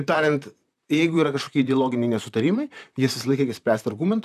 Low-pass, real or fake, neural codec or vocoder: 14.4 kHz; fake; vocoder, 44.1 kHz, 128 mel bands, Pupu-Vocoder